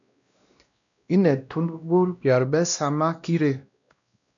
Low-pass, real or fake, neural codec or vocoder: 7.2 kHz; fake; codec, 16 kHz, 1 kbps, X-Codec, WavLM features, trained on Multilingual LibriSpeech